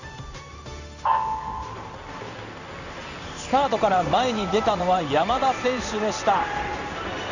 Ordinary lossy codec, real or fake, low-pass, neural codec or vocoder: none; fake; 7.2 kHz; codec, 16 kHz in and 24 kHz out, 1 kbps, XY-Tokenizer